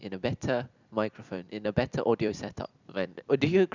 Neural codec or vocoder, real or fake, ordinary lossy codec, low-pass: vocoder, 44.1 kHz, 128 mel bands every 256 samples, BigVGAN v2; fake; none; 7.2 kHz